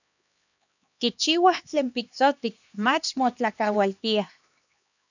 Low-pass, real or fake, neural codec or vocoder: 7.2 kHz; fake; codec, 16 kHz, 2 kbps, X-Codec, HuBERT features, trained on LibriSpeech